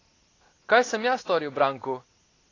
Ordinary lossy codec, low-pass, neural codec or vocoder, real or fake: AAC, 32 kbps; 7.2 kHz; none; real